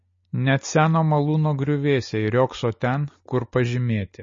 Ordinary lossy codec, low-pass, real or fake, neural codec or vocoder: MP3, 32 kbps; 7.2 kHz; real; none